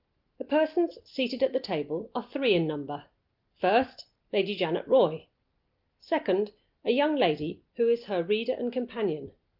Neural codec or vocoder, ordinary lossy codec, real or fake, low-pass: none; Opus, 32 kbps; real; 5.4 kHz